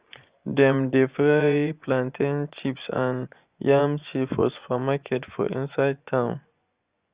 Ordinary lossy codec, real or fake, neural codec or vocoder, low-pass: Opus, 64 kbps; fake; vocoder, 24 kHz, 100 mel bands, Vocos; 3.6 kHz